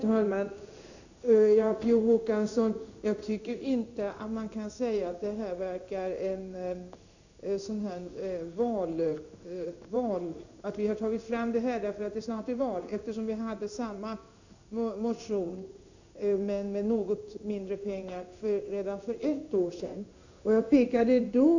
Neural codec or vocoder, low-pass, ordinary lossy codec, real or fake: codec, 16 kHz in and 24 kHz out, 1 kbps, XY-Tokenizer; 7.2 kHz; none; fake